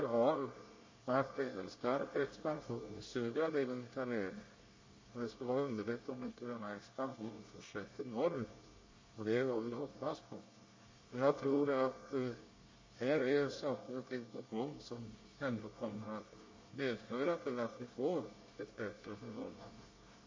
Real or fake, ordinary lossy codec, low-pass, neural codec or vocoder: fake; MP3, 32 kbps; 7.2 kHz; codec, 24 kHz, 1 kbps, SNAC